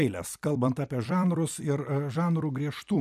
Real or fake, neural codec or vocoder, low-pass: fake; vocoder, 44.1 kHz, 128 mel bands every 256 samples, BigVGAN v2; 14.4 kHz